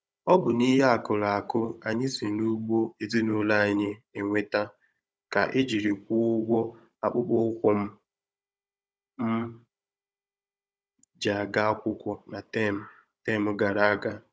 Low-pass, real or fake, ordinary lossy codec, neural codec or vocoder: none; fake; none; codec, 16 kHz, 16 kbps, FunCodec, trained on Chinese and English, 50 frames a second